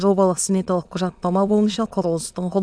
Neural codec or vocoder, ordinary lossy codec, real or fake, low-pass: autoencoder, 22.05 kHz, a latent of 192 numbers a frame, VITS, trained on many speakers; none; fake; none